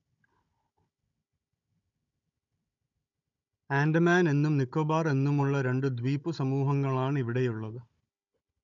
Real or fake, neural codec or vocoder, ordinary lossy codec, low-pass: fake; codec, 16 kHz, 16 kbps, FunCodec, trained on Chinese and English, 50 frames a second; none; 7.2 kHz